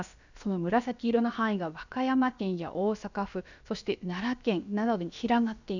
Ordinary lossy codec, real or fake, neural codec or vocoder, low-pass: none; fake; codec, 16 kHz, about 1 kbps, DyCAST, with the encoder's durations; 7.2 kHz